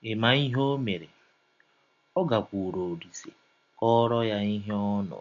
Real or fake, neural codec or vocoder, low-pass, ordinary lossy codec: real; none; 7.2 kHz; MP3, 48 kbps